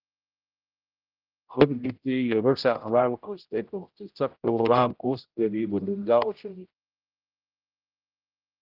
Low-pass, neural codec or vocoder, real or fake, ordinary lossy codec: 5.4 kHz; codec, 16 kHz, 0.5 kbps, X-Codec, HuBERT features, trained on general audio; fake; Opus, 32 kbps